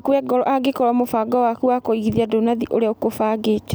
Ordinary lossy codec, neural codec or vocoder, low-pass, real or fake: none; none; none; real